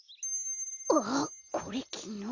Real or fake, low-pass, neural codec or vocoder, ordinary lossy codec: real; 7.2 kHz; none; Opus, 64 kbps